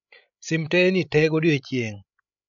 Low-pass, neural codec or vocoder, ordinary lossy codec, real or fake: 7.2 kHz; codec, 16 kHz, 16 kbps, FreqCodec, larger model; none; fake